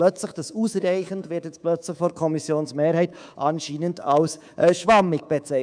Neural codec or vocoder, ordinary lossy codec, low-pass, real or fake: codec, 24 kHz, 3.1 kbps, DualCodec; none; 9.9 kHz; fake